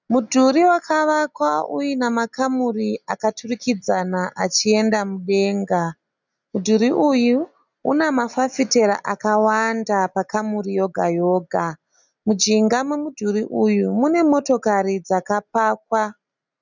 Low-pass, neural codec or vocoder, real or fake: 7.2 kHz; none; real